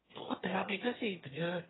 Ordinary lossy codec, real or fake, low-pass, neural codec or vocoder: AAC, 16 kbps; fake; 7.2 kHz; autoencoder, 22.05 kHz, a latent of 192 numbers a frame, VITS, trained on one speaker